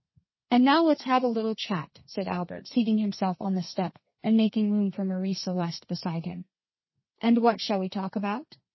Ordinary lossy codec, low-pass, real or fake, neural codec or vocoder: MP3, 24 kbps; 7.2 kHz; fake; codec, 32 kHz, 1.9 kbps, SNAC